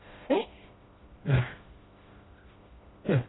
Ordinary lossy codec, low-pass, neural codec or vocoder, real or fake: AAC, 16 kbps; 7.2 kHz; codec, 16 kHz, 1 kbps, FreqCodec, smaller model; fake